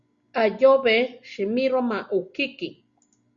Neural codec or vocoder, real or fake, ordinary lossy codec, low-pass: none; real; Opus, 64 kbps; 7.2 kHz